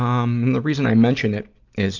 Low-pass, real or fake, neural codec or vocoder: 7.2 kHz; real; none